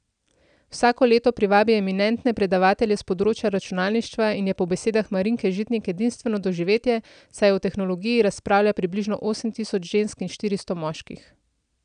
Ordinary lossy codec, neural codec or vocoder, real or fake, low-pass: none; none; real; 9.9 kHz